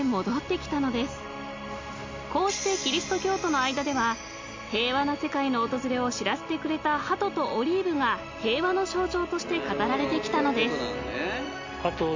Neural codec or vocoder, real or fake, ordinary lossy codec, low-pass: none; real; MP3, 64 kbps; 7.2 kHz